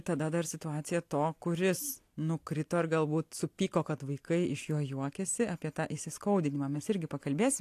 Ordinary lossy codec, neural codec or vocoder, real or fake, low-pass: AAC, 64 kbps; none; real; 14.4 kHz